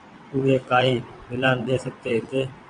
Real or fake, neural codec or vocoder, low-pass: fake; vocoder, 22.05 kHz, 80 mel bands, WaveNeXt; 9.9 kHz